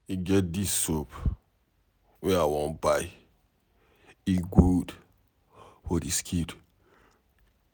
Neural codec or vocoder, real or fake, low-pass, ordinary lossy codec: none; real; none; none